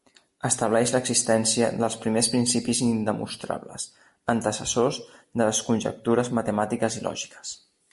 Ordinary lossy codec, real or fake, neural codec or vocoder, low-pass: MP3, 48 kbps; real; none; 14.4 kHz